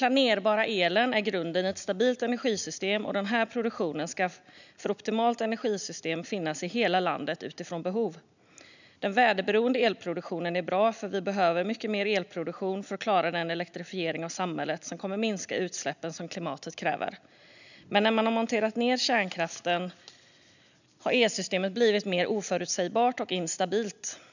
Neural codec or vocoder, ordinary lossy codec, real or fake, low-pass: none; none; real; 7.2 kHz